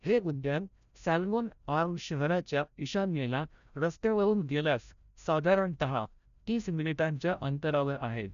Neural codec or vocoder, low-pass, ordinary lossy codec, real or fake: codec, 16 kHz, 0.5 kbps, FreqCodec, larger model; 7.2 kHz; none; fake